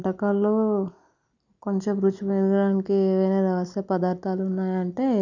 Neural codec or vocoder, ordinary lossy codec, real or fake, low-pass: none; none; real; 7.2 kHz